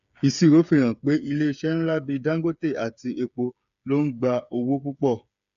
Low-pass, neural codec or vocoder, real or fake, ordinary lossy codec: 7.2 kHz; codec, 16 kHz, 8 kbps, FreqCodec, smaller model; fake; none